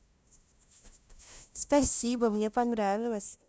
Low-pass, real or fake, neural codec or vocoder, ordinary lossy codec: none; fake; codec, 16 kHz, 0.5 kbps, FunCodec, trained on LibriTTS, 25 frames a second; none